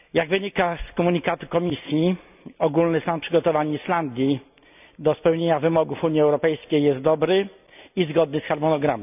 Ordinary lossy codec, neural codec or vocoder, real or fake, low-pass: none; none; real; 3.6 kHz